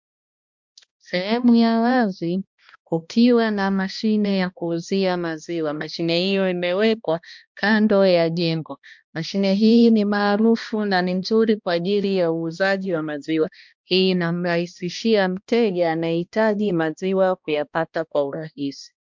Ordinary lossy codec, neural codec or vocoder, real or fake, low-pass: MP3, 64 kbps; codec, 16 kHz, 1 kbps, X-Codec, HuBERT features, trained on balanced general audio; fake; 7.2 kHz